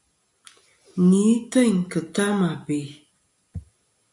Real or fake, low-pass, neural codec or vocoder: real; 10.8 kHz; none